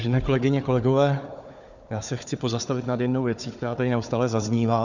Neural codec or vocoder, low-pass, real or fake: codec, 16 kHz, 4 kbps, FunCodec, trained on Chinese and English, 50 frames a second; 7.2 kHz; fake